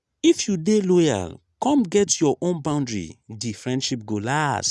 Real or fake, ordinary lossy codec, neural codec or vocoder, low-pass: real; none; none; none